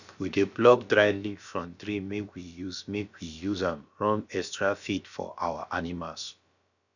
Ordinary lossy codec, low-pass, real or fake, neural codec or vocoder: none; 7.2 kHz; fake; codec, 16 kHz, about 1 kbps, DyCAST, with the encoder's durations